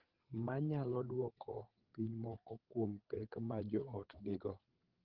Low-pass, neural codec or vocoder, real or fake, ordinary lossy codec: 5.4 kHz; codec, 16 kHz, 8 kbps, FreqCodec, larger model; fake; Opus, 16 kbps